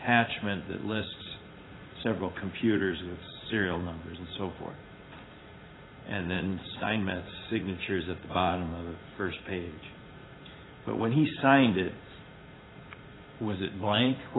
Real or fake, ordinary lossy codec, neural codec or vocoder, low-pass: fake; AAC, 16 kbps; vocoder, 44.1 kHz, 80 mel bands, Vocos; 7.2 kHz